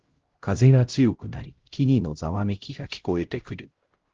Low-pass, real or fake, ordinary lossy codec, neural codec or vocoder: 7.2 kHz; fake; Opus, 16 kbps; codec, 16 kHz, 0.5 kbps, X-Codec, HuBERT features, trained on LibriSpeech